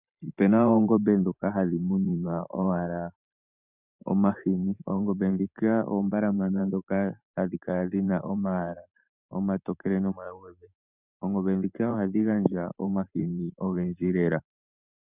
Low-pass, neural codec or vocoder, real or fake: 3.6 kHz; vocoder, 44.1 kHz, 128 mel bands every 512 samples, BigVGAN v2; fake